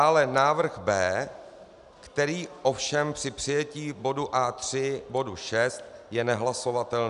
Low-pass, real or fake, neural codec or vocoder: 10.8 kHz; real; none